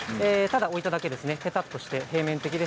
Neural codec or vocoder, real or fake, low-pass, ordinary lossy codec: none; real; none; none